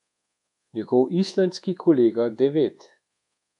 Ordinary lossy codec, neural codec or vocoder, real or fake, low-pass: none; codec, 24 kHz, 1.2 kbps, DualCodec; fake; 10.8 kHz